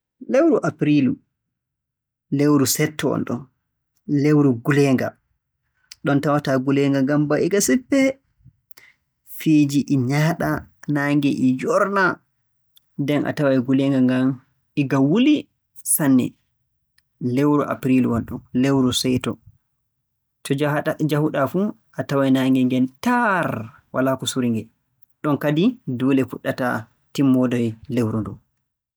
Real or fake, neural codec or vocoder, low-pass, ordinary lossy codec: real; none; none; none